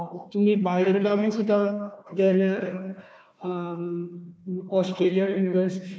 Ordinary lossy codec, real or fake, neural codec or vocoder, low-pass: none; fake; codec, 16 kHz, 1 kbps, FunCodec, trained on Chinese and English, 50 frames a second; none